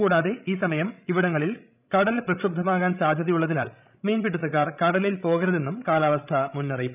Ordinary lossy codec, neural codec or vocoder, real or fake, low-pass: none; codec, 16 kHz, 16 kbps, FreqCodec, larger model; fake; 3.6 kHz